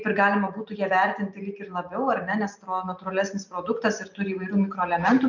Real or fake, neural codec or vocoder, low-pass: real; none; 7.2 kHz